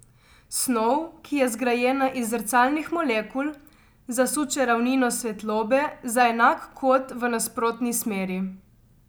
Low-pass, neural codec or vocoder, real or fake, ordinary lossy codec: none; none; real; none